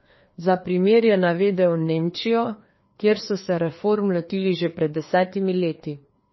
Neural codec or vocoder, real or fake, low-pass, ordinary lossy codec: codec, 16 kHz, 2 kbps, FreqCodec, larger model; fake; 7.2 kHz; MP3, 24 kbps